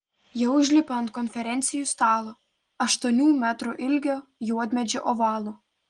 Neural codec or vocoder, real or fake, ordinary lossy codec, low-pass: none; real; Opus, 24 kbps; 9.9 kHz